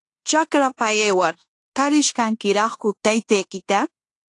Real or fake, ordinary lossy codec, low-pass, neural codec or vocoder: fake; AAC, 48 kbps; 10.8 kHz; codec, 16 kHz in and 24 kHz out, 0.9 kbps, LongCat-Audio-Codec, fine tuned four codebook decoder